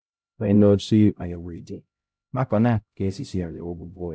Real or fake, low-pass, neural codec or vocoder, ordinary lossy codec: fake; none; codec, 16 kHz, 0.5 kbps, X-Codec, HuBERT features, trained on LibriSpeech; none